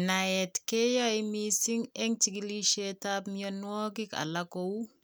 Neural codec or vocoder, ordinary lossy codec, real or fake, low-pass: none; none; real; none